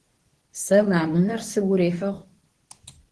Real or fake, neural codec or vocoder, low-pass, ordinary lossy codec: fake; codec, 24 kHz, 0.9 kbps, WavTokenizer, medium speech release version 2; 10.8 kHz; Opus, 16 kbps